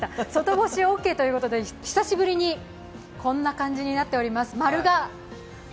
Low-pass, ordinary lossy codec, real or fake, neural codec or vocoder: none; none; real; none